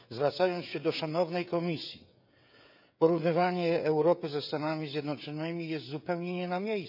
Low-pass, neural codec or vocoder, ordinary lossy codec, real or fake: 5.4 kHz; codec, 16 kHz, 16 kbps, FreqCodec, smaller model; none; fake